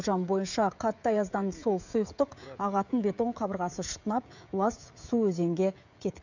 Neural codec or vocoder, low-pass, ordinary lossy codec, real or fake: codec, 16 kHz, 16 kbps, FreqCodec, smaller model; 7.2 kHz; none; fake